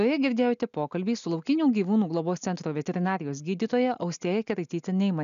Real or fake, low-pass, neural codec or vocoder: real; 7.2 kHz; none